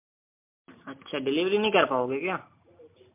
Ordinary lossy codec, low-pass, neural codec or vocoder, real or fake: MP3, 32 kbps; 3.6 kHz; none; real